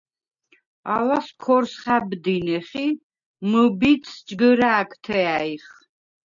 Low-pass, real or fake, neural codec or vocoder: 7.2 kHz; real; none